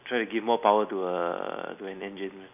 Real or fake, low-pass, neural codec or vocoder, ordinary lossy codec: real; 3.6 kHz; none; none